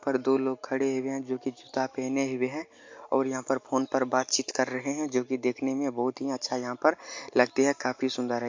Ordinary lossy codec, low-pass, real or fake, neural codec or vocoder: MP3, 48 kbps; 7.2 kHz; real; none